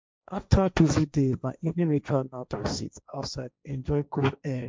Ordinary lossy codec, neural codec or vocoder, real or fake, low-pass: none; codec, 16 kHz, 1.1 kbps, Voila-Tokenizer; fake; none